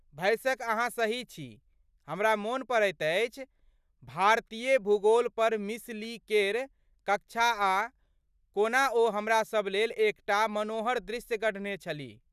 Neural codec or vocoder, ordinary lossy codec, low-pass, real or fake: none; none; 14.4 kHz; real